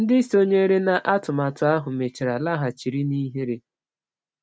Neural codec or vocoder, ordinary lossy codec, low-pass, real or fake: none; none; none; real